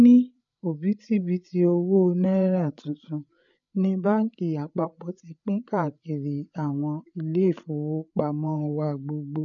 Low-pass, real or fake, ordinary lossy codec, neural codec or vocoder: 7.2 kHz; fake; none; codec, 16 kHz, 8 kbps, FreqCodec, larger model